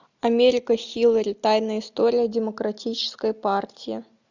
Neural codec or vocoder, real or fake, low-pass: none; real; 7.2 kHz